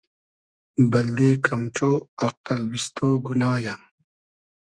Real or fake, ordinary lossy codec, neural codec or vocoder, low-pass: fake; Opus, 64 kbps; codec, 32 kHz, 1.9 kbps, SNAC; 9.9 kHz